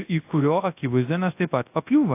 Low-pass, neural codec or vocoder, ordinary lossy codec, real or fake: 3.6 kHz; codec, 24 kHz, 0.9 kbps, WavTokenizer, large speech release; AAC, 24 kbps; fake